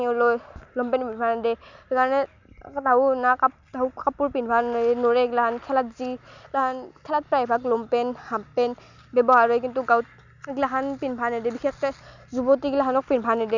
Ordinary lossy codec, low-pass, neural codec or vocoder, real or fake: none; 7.2 kHz; none; real